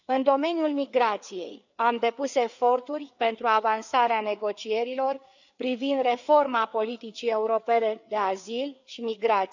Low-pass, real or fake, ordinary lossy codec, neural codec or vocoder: 7.2 kHz; fake; none; codec, 16 kHz, 4 kbps, FreqCodec, larger model